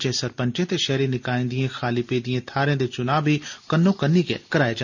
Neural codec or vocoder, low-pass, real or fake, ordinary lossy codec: none; 7.2 kHz; real; none